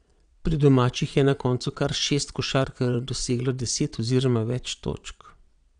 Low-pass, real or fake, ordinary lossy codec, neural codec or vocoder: 9.9 kHz; fake; none; vocoder, 22.05 kHz, 80 mel bands, Vocos